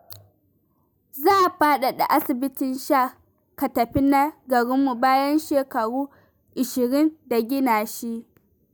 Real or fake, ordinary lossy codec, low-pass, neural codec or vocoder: real; none; none; none